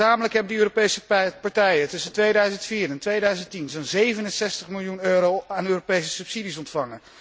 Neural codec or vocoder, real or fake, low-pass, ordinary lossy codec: none; real; none; none